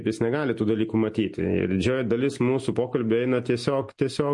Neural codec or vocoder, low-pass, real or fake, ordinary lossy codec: none; 10.8 kHz; real; MP3, 48 kbps